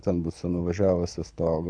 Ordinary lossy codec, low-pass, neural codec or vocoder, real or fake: AAC, 64 kbps; 9.9 kHz; codec, 24 kHz, 6 kbps, HILCodec; fake